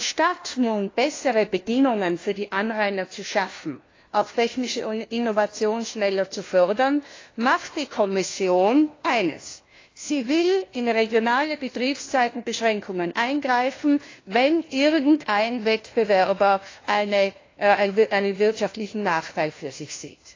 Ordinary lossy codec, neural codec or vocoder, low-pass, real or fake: AAC, 32 kbps; codec, 16 kHz, 1 kbps, FunCodec, trained on LibriTTS, 50 frames a second; 7.2 kHz; fake